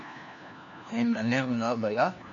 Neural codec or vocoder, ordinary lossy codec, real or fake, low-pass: codec, 16 kHz, 1 kbps, FunCodec, trained on LibriTTS, 50 frames a second; AAC, 64 kbps; fake; 7.2 kHz